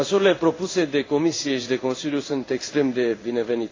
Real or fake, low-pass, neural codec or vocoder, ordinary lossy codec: fake; 7.2 kHz; codec, 16 kHz in and 24 kHz out, 1 kbps, XY-Tokenizer; AAC, 32 kbps